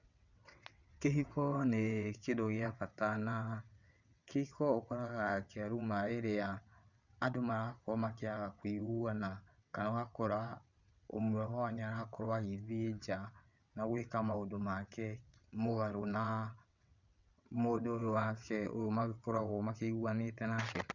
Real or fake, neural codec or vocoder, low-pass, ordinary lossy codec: fake; vocoder, 22.05 kHz, 80 mel bands, WaveNeXt; 7.2 kHz; none